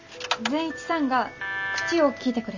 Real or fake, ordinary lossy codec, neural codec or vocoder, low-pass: real; MP3, 64 kbps; none; 7.2 kHz